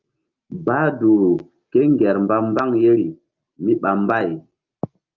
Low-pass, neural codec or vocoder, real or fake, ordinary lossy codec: 7.2 kHz; none; real; Opus, 24 kbps